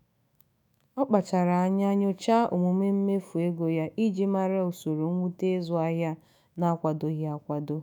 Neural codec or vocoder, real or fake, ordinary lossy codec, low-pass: autoencoder, 48 kHz, 128 numbers a frame, DAC-VAE, trained on Japanese speech; fake; none; none